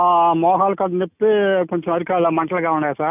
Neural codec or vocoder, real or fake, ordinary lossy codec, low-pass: none; real; none; 3.6 kHz